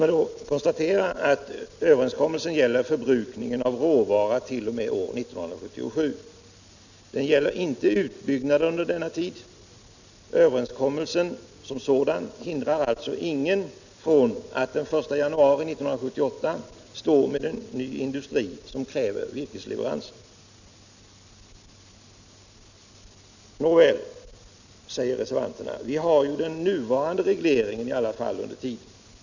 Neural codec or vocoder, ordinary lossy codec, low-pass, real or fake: none; none; 7.2 kHz; real